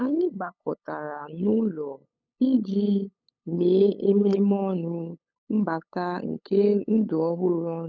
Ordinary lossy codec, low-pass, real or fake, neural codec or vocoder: none; 7.2 kHz; fake; codec, 16 kHz, 8 kbps, FunCodec, trained on LibriTTS, 25 frames a second